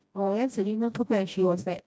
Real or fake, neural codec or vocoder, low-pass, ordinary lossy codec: fake; codec, 16 kHz, 1 kbps, FreqCodec, smaller model; none; none